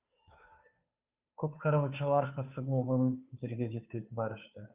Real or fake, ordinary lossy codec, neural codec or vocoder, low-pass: fake; Opus, 64 kbps; codec, 16 kHz, 2 kbps, FunCodec, trained on Chinese and English, 25 frames a second; 3.6 kHz